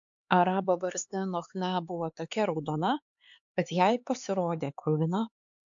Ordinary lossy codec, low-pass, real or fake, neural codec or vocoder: AAC, 64 kbps; 7.2 kHz; fake; codec, 16 kHz, 4 kbps, X-Codec, HuBERT features, trained on LibriSpeech